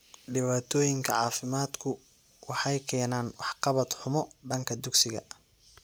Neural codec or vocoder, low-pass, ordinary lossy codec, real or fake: none; none; none; real